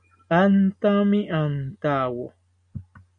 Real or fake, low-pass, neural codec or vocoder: real; 9.9 kHz; none